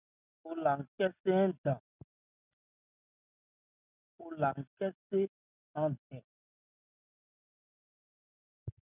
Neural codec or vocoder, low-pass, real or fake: none; 3.6 kHz; real